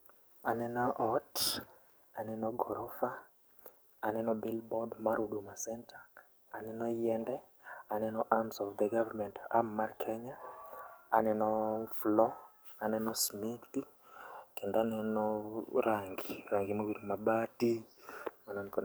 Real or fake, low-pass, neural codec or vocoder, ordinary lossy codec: fake; none; codec, 44.1 kHz, 7.8 kbps, DAC; none